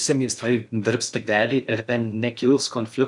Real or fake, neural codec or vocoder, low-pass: fake; codec, 16 kHz in and 24 kHz out, 0.6 kbps, FocalCodec, streaming, 4096 codes; 10.8 kHz